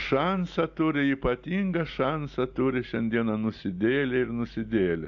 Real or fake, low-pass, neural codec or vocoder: real; 7.2 kHz; none